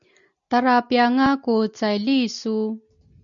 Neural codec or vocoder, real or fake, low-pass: none; real; 7.2 kHz